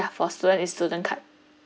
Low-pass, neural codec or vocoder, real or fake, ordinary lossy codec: none; none; real; none